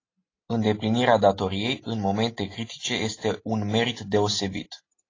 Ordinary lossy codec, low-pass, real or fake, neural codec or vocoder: AAC, 32 kbps; 7.2 kHz; real; none